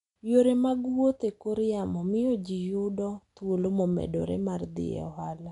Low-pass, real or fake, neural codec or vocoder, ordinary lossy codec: 10.8 kHz; real; none; none